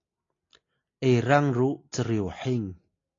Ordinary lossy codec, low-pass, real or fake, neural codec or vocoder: AAC, 32 kbps; 7.2 kHz; real; none